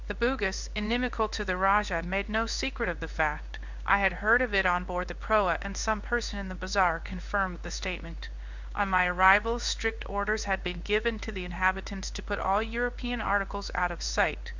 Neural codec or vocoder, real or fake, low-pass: codec, 16 kHz in and 24 kHz out, 1 kbps, XY-Tokenizer; fake; 7.2 kHz